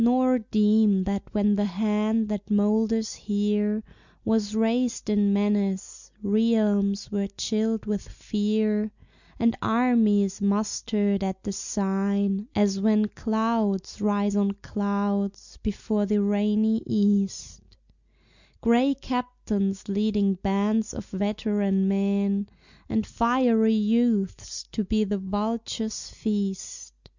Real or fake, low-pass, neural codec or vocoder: real; 7.2 kHz; none